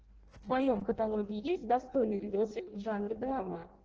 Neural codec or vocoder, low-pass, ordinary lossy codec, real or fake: codec, 16 kHz in and 24 kHz out, 0.6 kbps, FireRedTTS-2 codec; 7.2 kHz; Opus, 16 kbps; fake